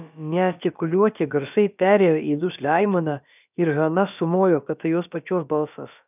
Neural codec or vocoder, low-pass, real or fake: codec, 16 kHz, about 1 kbps, DyCAST, with the encoder's durations; 3.6 kHz; fake